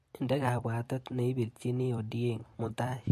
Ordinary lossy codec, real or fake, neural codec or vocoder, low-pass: MP3, 64 kbps; fake; vocoder, 44.1 kHz, 128 mel bands every 256 samples, BigVGAN v2; 14.4 kHz